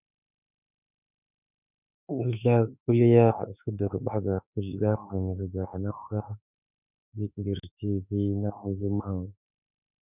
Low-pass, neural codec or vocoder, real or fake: 3.6 kHz; autoencoder, 48 kHz, 32 numbers a frame, DAC-VAE, trained on Japanese speech; fake